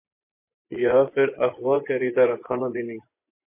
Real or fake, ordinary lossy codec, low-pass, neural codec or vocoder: fake; MP3, 24 kbps; 3.6 kHz; vocoder, 24 kHz, 100 mel bands, Vocos